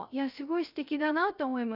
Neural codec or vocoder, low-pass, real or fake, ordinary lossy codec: codec, 16 kHz, 0.3 kbps, FocalCodec; 5.4 kHz; fake; none